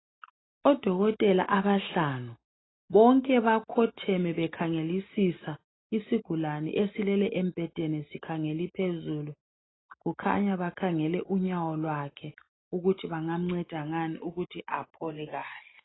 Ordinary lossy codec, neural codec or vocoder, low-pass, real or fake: AAC, 16 kbps; none; 7.2 kHz; real